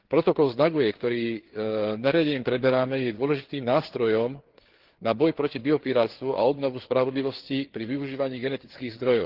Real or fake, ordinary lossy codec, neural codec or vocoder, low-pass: fake; Opus, 16 kbps; codec, 16 kHz, 4 kbps, FreqCodec, larger model; 5.4 kHz